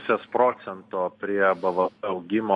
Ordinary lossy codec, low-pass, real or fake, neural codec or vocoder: MP3, 48 kbps; 10.8 kHz; real; none